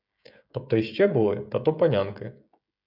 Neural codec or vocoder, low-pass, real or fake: codec, 16 kHz, 16 kbps, FreqCodec, smaller model; 5.4 kHz; fake